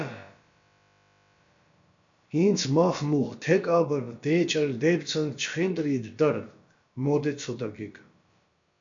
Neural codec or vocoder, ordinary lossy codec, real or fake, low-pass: codec, 16 kHz, about 1 kbps, DyCAST, with the encoder's durations; AAC, 64 kbps; fake; 7.2 kHz